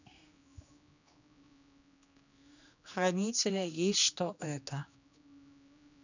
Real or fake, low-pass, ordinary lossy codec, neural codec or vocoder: fake; 7.2 kHz; none; codec, 16 kHz, 2 kbps, X-Codec, HuBERT features, trained on general audio